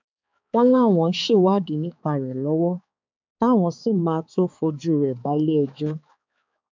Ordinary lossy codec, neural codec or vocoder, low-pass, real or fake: MP3, 64 kbps; codec, 16 kHz, 4 kbps, X-Codec, HuBERT features, trained on balanced general audio; 7.2 kHz; fake